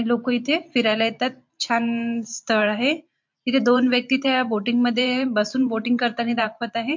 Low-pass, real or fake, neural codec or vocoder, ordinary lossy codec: 7.2 kHz; fake; vocoder, 44.1 kHz, 128 mel bands every 512 samples, BigVGAN v2; MP3, 48 kbps